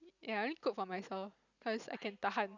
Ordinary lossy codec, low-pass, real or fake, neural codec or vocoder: none; 7.2 kHz; real; none